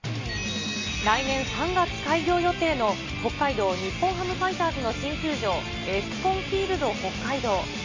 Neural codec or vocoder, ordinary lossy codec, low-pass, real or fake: none; MP3, 32 kbps; 7.2 kHz; real